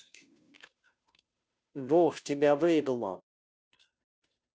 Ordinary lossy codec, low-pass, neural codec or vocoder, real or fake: none; none; codec, 16 kHz, 0.5 kbps, FunCodec, trained on Chinese and English, 25 frames a second; fake